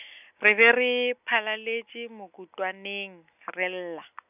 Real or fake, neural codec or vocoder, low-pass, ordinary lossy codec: real; none; 3.6 kHz; none